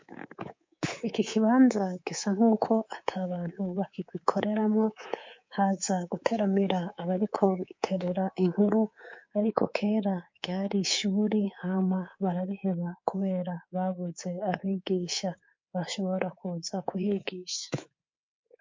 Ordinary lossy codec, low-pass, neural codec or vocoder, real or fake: MP3, 48 kbps; 7.2 kHz; codec, 24 kHz, 3.1 kbps, DualCodec; fake